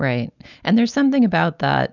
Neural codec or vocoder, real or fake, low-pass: none; real; 7.2 kHz